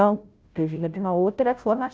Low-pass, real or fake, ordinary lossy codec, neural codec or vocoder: none; fake; none; codec, 16 kHz, 0.5 kbps, FunCodec, trained on Chinese and English, 25 frames a second